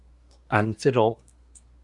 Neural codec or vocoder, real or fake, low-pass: codec, 24 kHz, 1 kbps, SNAC; fake; 10.8 kHz